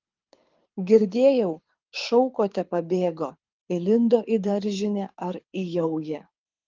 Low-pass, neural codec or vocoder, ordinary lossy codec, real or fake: 7.2 kHz; codec, 24 kHz, 6 kbps, HILCodec; Opus, 32 kbps; fake